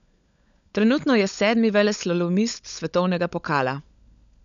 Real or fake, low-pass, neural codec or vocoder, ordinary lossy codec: fake; 7.2 kHz; codec, 16 kHz, 16 kbps, FunCodec, trained on LibriTTS, 50 frames a second; none